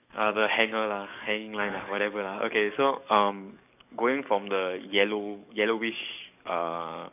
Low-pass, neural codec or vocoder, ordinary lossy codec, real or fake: 3.6 kHz; codec, 44.1 kHz, 7.8 kbps, DAC; none; fake